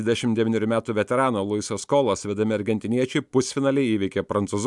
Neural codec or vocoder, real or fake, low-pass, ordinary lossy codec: none; real; 10.8 kHz; MP3, 96 kbps